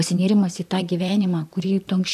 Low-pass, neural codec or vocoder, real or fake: 14.4 kHz; vocoder, 44.1 kHz, 128 mel bands, Pupu-Vocoder; fake